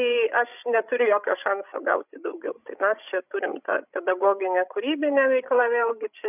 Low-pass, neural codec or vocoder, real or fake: 3.6 kHz; codec, 16 kHz, 16 kbps, FreqCodec, larger model; fake